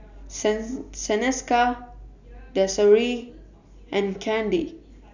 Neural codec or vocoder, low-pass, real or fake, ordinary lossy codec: none; 7.2 kHz; real; none